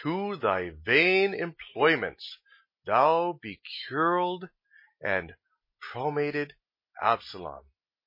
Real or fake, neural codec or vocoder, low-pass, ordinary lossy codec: real; none; 5.4 kHz; MP3, 24 kbps